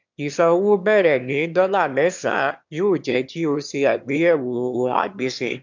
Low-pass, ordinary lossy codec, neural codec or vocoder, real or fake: 7.2 kHz; MP3, 64 kbps; autoencoder, 22.05 kHz, a latent of 192 numbers a frame, VITS, trained on one speaker; fake